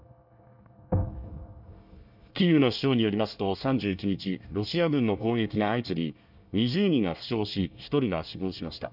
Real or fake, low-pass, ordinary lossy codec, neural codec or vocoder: fake; 5.4 kHz; none; codec, 24 kHz, 1 kbps, SNAC